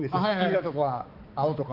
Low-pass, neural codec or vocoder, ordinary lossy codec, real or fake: 5.4 kHz; codec, 16 kHz, 4 kbps, X-Codec, HuBERT features, trained on balanced general audio; Opus, 32 kbps; fake